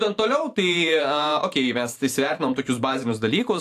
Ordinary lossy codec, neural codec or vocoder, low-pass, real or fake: AAC, 64 kbps; vocoder, 44.1 kHz, 128 mel bands every 512 samples, BigVGAN v2; 14.4 kHz; fake